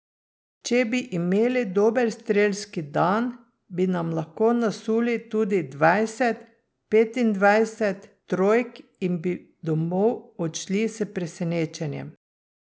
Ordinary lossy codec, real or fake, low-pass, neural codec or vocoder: none; real; none; none